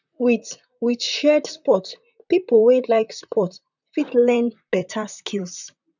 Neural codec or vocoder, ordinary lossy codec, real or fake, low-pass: vocoder, 44.1 kHz, 128 mel bands, Pupu-Vocoder; none; fake; 7.2 kHz